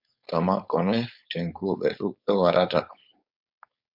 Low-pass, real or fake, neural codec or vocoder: 5.4 kHz; fake; codec, 16 kHz, 4.8 kbps, FACodec